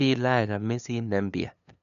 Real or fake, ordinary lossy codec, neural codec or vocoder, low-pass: fake; none; codec, 16 kHz, 2 kbps, FunCodec, trained on LibriTTS, 25 frames a second; 7.2 kHz